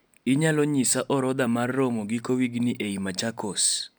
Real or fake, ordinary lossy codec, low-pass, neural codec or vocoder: real; none; none; none